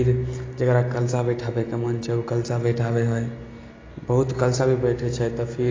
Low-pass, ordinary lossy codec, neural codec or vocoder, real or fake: 7.2 kHz; AAC, 32 kbps; none; real